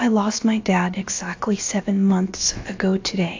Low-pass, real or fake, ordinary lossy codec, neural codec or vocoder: 7.2 kHz; fake; AAC, 48 kbps; codec, 16 kHz, about 1 kbps, DyCAST, with the encoder's durations